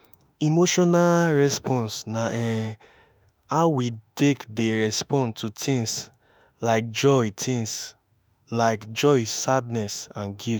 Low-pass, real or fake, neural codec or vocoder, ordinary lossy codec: none; fake; autoencoder, 48 kHz, 32 numbers a frame, DAC-VAE, trained on Japanese speech; none